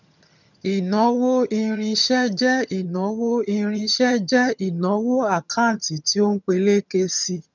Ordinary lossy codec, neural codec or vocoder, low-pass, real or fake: none; vocoder, 22.05 kHz, 80 mel bands, HiFi-GAN; 7.2 kHz; fake